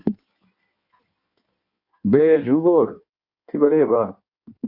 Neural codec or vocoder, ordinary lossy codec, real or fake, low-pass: codec, 16 kHz in and 24 kHz out, 1.1 kbps, FireRedTTS-2 codec; AAC, 48 kbps; fake; 5.4 kHz